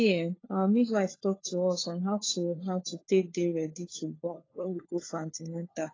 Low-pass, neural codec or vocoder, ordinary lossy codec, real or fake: 7.2 kHz; codec, 16 kHz, 4 kbps, FunCodec, trained on LibriTTS, 50 frames a second; AAC, 32 kbps; fake